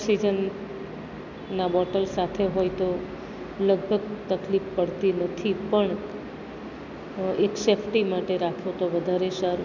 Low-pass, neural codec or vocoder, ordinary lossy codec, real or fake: 7.2 kHz; none; none; real